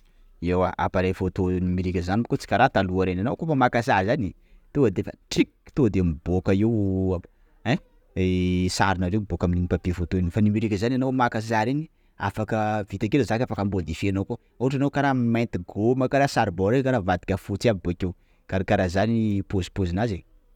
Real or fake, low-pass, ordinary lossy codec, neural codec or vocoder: real; 19.8 kHz; none; none